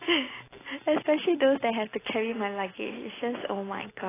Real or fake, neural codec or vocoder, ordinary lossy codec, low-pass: real; none; AAC, 16 kbps; 3.6 kHz